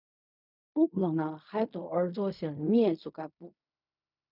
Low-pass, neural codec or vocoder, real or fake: 5.4 kHz; codec, 16 kHz in and 24 kHz out, 0.4 kbps, LongCat-Audio-Codec, fine tuned four codebook decoder; fake